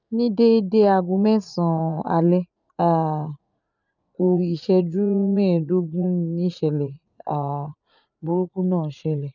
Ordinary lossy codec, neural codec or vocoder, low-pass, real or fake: none; vocoder, 44.1 kHz, 80 mel bands, Vocos; 7.2 kHz; fake